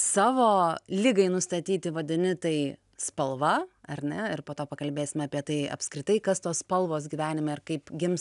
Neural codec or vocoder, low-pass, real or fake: none; 10.8 kHz; real